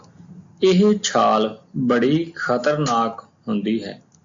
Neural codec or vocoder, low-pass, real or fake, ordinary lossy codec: none; 7.2 kHz; real; AAC, 64 kbps